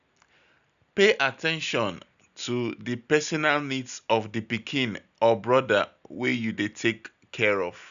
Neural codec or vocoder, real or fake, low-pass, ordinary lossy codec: none; real; 7.2 kHz; none